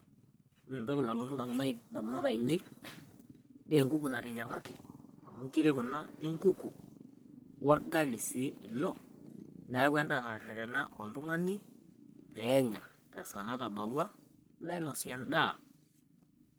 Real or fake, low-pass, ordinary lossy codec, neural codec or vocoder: fake; none; none; codec, 44.1 kHz, 1.7 kbps, Pupu-Codec